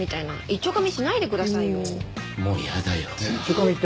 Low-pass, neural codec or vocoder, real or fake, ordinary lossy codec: none; none; real; none